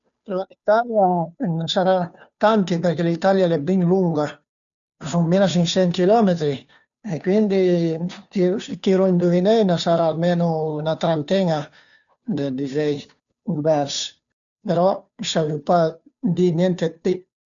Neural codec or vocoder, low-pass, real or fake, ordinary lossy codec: codec, 16 kHz, 2 kbps, FunCodec, trained on Chinese and English, 25 frames a second; 7.2 kHz; fake; none